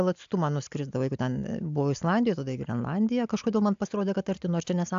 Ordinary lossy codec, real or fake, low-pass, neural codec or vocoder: AAC, 64 kbps; real; 7.2 kHz; none